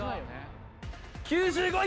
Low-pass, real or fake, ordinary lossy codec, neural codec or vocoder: none; real; none; none